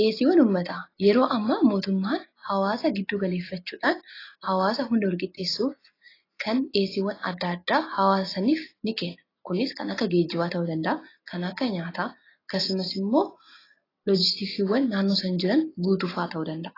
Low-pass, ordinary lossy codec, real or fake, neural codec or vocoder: 5.4 kHz; AAC, 24 kbps; real; none